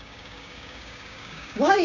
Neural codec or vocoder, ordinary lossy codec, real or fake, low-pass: codec, 16 kHz, 6 kbps, DAC; none; fake; 7.2 kHz